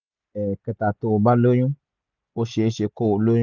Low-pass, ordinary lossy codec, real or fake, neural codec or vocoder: 7.2 kHz; none; real; none